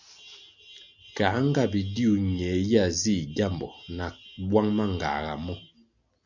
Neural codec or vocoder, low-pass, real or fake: none; 7.2 kHz; real